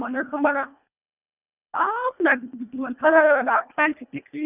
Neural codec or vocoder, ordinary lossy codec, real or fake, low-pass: codec, 24 kHz, 1.5 kbps, HILCodec; none; fake; 3.6 kHz